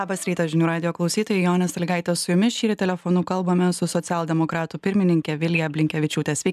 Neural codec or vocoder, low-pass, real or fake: none; 14.4 kHz; real